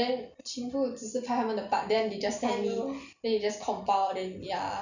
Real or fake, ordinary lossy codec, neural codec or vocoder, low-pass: real; none; none; 7.2 kHz